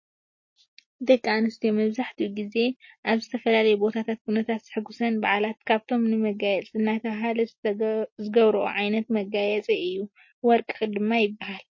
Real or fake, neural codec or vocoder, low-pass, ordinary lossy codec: real; none; 7.2 kHz; MP3, 32 kbps